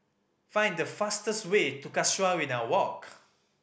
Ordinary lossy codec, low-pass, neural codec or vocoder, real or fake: none; none; none; real